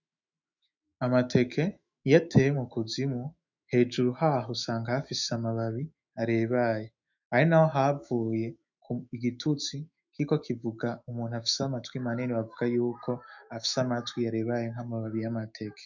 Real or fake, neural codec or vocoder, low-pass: fake; autoencoder, 48 kHz, 128 numbers a frame, DAC-VAE, trained on Japanese speech; 7.2 kHz